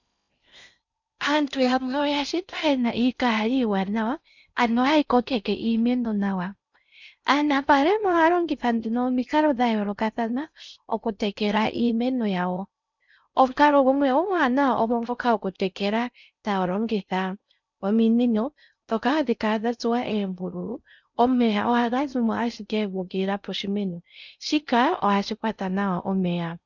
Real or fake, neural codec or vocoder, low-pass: fake; codec, 16 kHz in and 24 kHz out, 0.6 kbps, FocalCodec, streaming, 4096 codes; 7.2 kHz